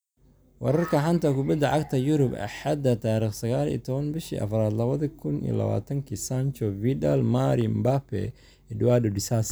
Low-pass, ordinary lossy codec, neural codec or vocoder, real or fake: none; none; none; real